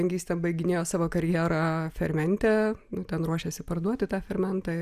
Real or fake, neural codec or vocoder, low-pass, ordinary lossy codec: real; none; 14.4 kHz; Opus, 64 kbps